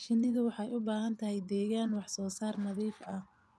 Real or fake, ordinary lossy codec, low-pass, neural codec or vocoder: fake; none; none; vocoder, 24 kHz, 100 mel bands, Vocos